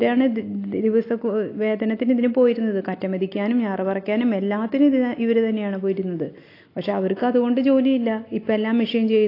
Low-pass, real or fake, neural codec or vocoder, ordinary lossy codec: 5.4 kHz; real; none; AAC, 32 kbps